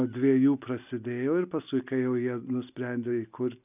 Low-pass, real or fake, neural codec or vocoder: 3.6 kHz; real; none